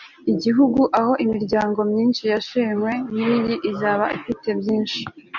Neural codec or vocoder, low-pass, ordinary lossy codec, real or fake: none; 7.2 kHz; MP3, 64 kbps; real